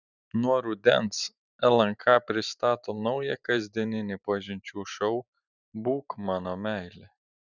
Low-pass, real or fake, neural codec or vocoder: 7.2 kHz; fake; vocoder, 44.1 kHz, 128 mel bands every 512 samples, BigVGAN v2